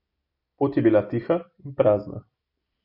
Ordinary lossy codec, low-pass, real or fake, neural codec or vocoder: AAC, 48 kbps; 5.4 kHz; fake; vocoder, 44.1 kHz, 128 mel bands every 256 samples, BigVGAN v2